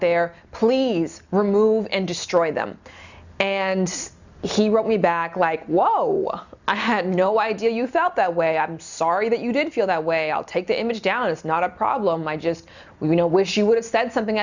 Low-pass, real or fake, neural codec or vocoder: 7.2 kHz; real; none